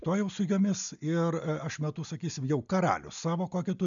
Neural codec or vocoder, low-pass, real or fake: none; 7.2 kHz; real